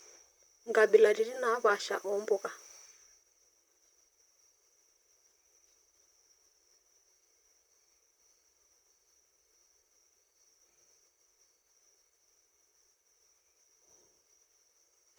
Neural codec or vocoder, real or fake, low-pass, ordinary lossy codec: vocoder, 44.1 kHz, 128 mel bands, Pupu-Vocoder; fake; none; none